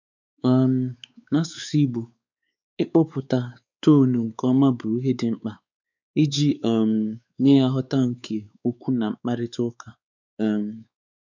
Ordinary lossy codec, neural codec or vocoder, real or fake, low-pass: none; codec, 16 kHz, 4 kbps, X-Codec, WavLM features, trained on Multilingual LibriSpeech; fake; 7.2 kHz